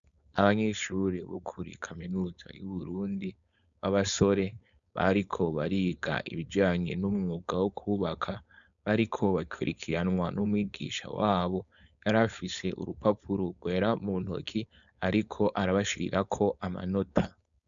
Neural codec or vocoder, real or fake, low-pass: codec, 16 kHz, 4.8 kbps, FACodec; fake; 7.2 kHz